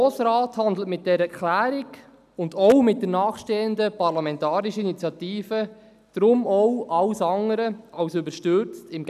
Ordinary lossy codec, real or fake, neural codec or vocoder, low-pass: none; real; none; 14.4 kHz